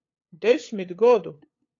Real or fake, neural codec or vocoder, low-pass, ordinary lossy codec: fake; codec, 16 kHz, 8 kbps, FunCodec, trained on LibriTTS, 25 frames a second; 7.2 kHz; AAC, 32 kbps